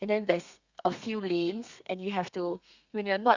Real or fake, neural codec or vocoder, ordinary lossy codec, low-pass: fake; codec, 32 kHz, 1.9 kbps, SNAC; Opus, 64 kbps; 7.2 kHz